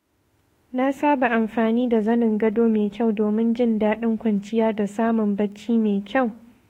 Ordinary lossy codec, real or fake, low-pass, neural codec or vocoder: AAC, 48 kbps; fake; 19.8 kHz; autoencoder, 48 kHz, 32 numbers a frame, DAC-VAE, trained on Japanese speech